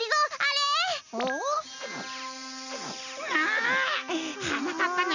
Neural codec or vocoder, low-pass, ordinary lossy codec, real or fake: none; 7.2 kHz; none; real